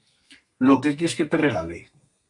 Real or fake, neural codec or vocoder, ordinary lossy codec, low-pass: fake; codec, 32 kHz, 1.9 kbps, SNAC; MP3, 96 kbps; 10.8 kHz